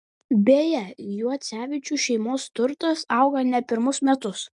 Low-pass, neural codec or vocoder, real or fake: 10.8 kHz; none; real